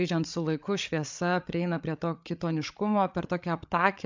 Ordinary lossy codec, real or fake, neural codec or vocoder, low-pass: MP3, 64 kbps; fake; codec, 16 kHz, 8 kbps, FreqCodec, larger model; 7.2 kHz